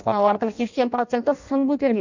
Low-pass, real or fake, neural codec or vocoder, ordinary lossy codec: 7.2 kHz; fake; codec, 16 kHz in and 24 kHz out, 0.6 kbps, FireRedTTS-2 codec; none